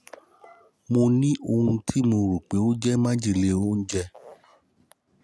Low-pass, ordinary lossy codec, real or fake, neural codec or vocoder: none; none; real; none